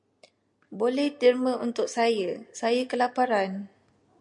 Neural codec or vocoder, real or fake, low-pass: none; real; 10.8 kHz